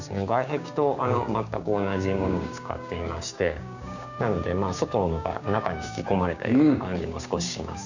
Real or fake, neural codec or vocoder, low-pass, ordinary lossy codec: fake; codec, 16 kHz, 6 kbps, DAC; 7.2 kHz; none